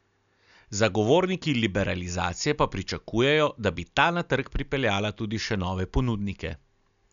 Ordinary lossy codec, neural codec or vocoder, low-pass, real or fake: none; none; 7.2 kHz; real